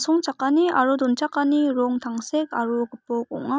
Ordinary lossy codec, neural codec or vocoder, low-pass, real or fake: none; none; none; real